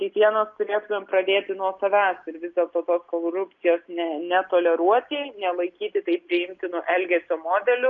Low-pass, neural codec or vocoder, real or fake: 7.2 kHz; none; real